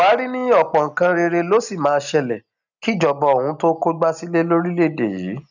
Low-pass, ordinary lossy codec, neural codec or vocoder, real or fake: 7.2 kHz; none; none; real